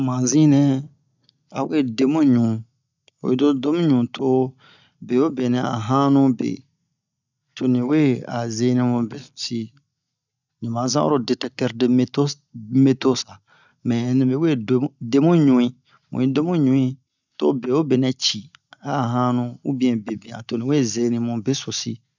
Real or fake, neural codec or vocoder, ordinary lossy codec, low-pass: real; none; none; 7.2 kHz